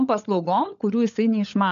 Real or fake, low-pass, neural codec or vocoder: fake; 7.2 kHz; codec, 16 kHz, 16 kbps, FunCodec, trained on LibriTTS, 50 frames a second